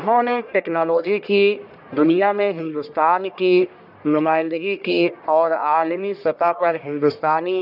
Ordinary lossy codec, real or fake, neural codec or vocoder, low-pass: none; fake; codec, 44.1 kHz, 1.7 kbps, Pupu-Codec; 5.4 kHz